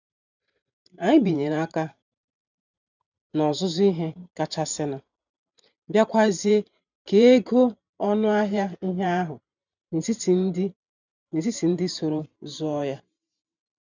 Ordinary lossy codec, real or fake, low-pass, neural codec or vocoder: none; fake; 7.2 kHz; vocoder, 44.1 kHz, 128 mel bands every 512 samples, BigVGAN v2